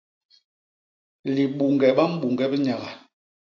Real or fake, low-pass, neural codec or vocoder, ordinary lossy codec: real; 7.2 kHz; none; AAC, 48 kbps